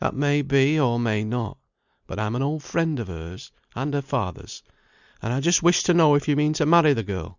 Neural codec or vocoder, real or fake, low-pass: none; real; 7.2 kHz